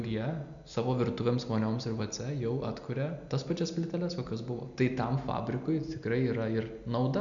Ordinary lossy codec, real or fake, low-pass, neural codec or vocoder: MP3, 96 kbps; real; 7.2 kHz; none